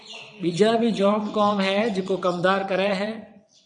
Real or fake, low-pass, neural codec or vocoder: fake; 9.9 kHz; vocoder, 22.05 kHz, 80 mel bands, WaveNeXt